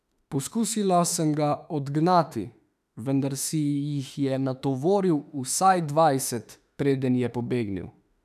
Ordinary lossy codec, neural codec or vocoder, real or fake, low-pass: none; autoencoder, 48 kHz, 32 numbers a frame, DAC-VAE, trained on Japanese speech; fake; 14.4 kHz